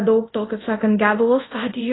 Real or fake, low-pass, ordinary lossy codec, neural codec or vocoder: fake; 7.2 kHz; AAC, 16 kbps; codec, 24 kHz, 0.5 kbps, DualCodec